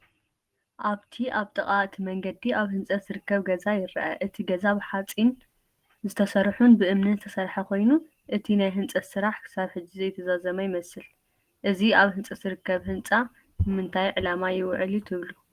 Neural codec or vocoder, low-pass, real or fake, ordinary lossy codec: none; 14.4 kHz; real; Opus, 24 kbps